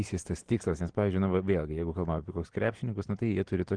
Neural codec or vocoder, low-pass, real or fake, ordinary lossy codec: none; 9.9 kHz; real; Opus, 16 kbps